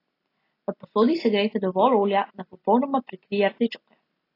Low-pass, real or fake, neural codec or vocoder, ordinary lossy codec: 5.4 kHz; real; none; AAC, 24 kbps